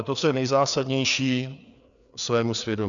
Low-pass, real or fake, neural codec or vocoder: 7.2 kHz; fake; codec, 16 kHz, 4 kbps, FreqCodec, larger model